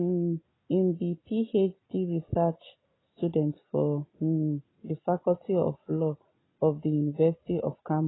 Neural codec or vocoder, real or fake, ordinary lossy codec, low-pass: none; real; AAC, 16 kbps; 7.2 kHz